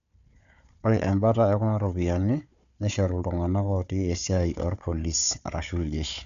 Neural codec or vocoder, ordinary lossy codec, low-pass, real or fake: codec, 16 kHz, 4 kbps, FunCodec, trained on Chinese and English, 50 frames a second; none; 7.2 kHz; fake